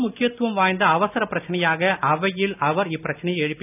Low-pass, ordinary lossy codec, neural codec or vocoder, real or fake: 3.6 kHz; none; none; real